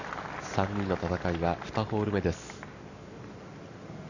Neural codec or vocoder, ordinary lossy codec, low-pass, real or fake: none; none; 7.2 kHz; real